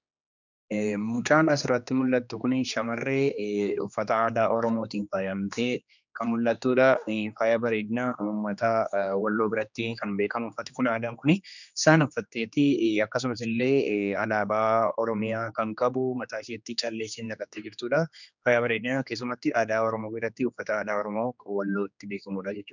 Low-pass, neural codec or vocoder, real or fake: 7.2 kHz; codec, 16 kHz, 2 kbps, X-Codec, HuBERT features, trained on general audio; fake